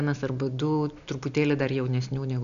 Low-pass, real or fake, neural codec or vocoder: 7.2 kHz; real; none